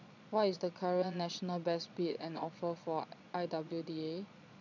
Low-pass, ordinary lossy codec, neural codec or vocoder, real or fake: 7.2 kHz; none; vocoder, 22.05 kHz, 80 mel bands, Vocos; fake